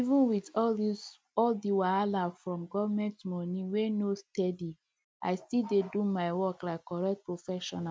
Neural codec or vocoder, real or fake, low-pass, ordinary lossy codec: none; real; none; none